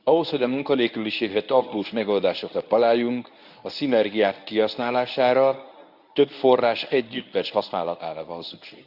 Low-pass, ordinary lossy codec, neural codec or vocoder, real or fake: 5.4 kHz; Opus, 64 kbps; codec, 24 kHz, 0.9 kbps, WavTokenizer, medium speech release version 1; fake